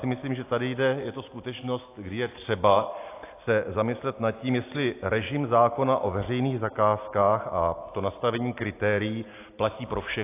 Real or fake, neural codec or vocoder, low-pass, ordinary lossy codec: real; none; 3.6 kHz; AAC, 24 kbps